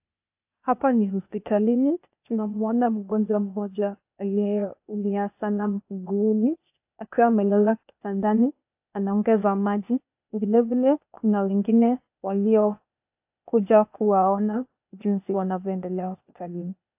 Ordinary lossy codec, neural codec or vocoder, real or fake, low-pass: AAC, 32 kbps; codec, 16 kHz, 0.8 kbps, ZipCodec; fake; 3.6 kHz